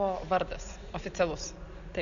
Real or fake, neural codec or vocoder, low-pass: real; none; 7.2 kHz